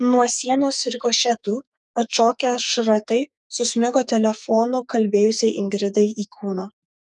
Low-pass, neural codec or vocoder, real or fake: 10.8 kHz; codec, 44.1 kHz, 2.6 kbps, SNAC; fake